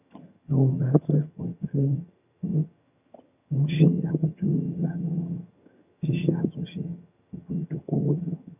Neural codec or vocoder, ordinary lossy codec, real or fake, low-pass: vocoder, 22.05 kHz, 80 mel bands, HiFi-GAN; none; fake; 3.6 kHz